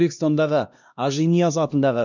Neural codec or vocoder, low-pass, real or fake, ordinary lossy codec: codec, 16 kHz, 1 kbps, X-Codec, HuBERT features, trained on LibriSpeech; 7.2 kHz; fake; none